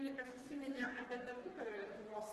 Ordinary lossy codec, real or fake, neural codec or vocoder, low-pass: Opus, 16 kbps; fake; codec, 44.1 kHz, 3.4 kbps, Pupu-Codec; 14.4 kHz